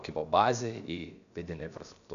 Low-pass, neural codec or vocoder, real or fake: 7.2 kHz; codec, 16 kHz, about 1 kbps, DyCAST, with the encoder's durations; fake